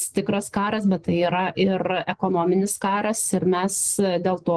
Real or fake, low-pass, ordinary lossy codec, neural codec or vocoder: real; 10.8 kHz; Opus, 16 kbps; none